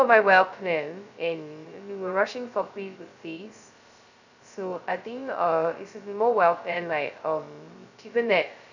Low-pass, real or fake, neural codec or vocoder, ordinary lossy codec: 7.2 kHz; fake; codec, 16 kHz, 0.2 kbps, FocalCodec; none